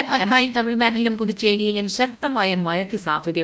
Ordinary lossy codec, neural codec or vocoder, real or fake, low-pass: none; codec, 16 kHz, 0.5 kbps, FreqCodec, larger model; fake; none